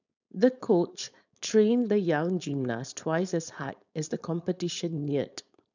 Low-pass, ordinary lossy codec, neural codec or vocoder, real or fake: 7.2 kHz; none; codec, 16 kHz, 4.8 kbps, FACodec; fake